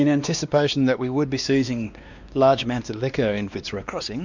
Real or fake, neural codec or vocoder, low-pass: fake; codec, 16 kHz, 2 kbps, X-Codec, WavLM features, trained on Multilingual LibriSpeech; 7.2 kHz